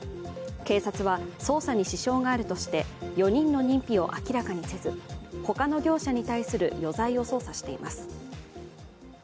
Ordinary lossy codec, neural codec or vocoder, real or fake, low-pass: none; none; real; none